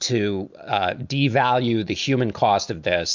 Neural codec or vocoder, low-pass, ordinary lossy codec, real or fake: none; 7.2 kHz; MP3, 64 kbps; real